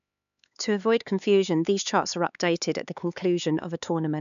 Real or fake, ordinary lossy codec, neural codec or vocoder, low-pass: fake; none; codec, 16 kHz, 4 kbps, X-Codec, HuBERT features, trained on LibriSpeech; 7.2 kHz